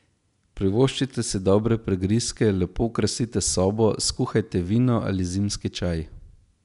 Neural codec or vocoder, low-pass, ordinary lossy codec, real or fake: none; 10.8 kHz; none; real